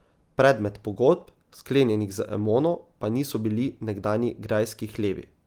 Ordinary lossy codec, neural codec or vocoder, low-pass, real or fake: Opus, 24 kbps; none; 19.8 kHz; real